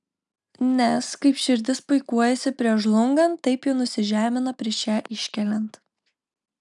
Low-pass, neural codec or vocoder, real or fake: 10.8 kHz; none; real